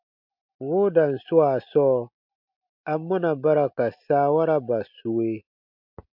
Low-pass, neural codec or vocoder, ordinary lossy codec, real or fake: 5.4 kHz; none; MP3, 48 kbps; real